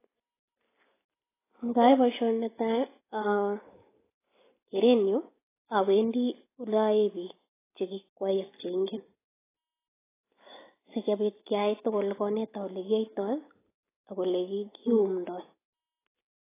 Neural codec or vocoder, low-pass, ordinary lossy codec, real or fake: none; 3.6 kHz; AAC, 16 kbps; real